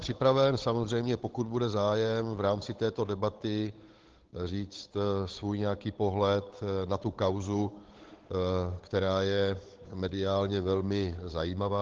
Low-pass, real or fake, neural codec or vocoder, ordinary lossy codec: 7.2 kHz; fake; codec, 16 kHz, 8 kbps, FunCodec, trained on Chinese and English, 25 frames a second; Opus, 16 kbps